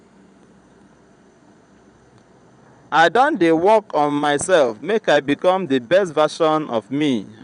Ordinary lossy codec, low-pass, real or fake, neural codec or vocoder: none; 9.9 kHz; fake; vocoder, 22.05 kHz, 80 mel bands, Vocos